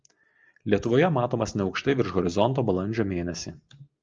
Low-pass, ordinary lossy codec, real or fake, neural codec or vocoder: 7.2 kHz; Opus, 24 kbps; real; none